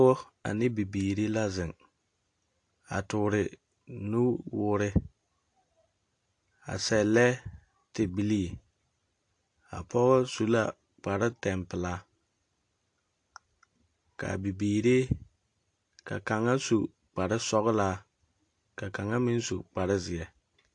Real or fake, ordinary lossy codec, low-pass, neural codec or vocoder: real; AAC, 48 kbps; 9.9 kHz; none